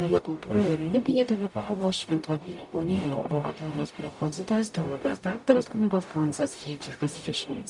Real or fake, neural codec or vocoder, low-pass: fake; codec, 44.1 kHz, 0.9 kbps, DAC; 10.8 kHz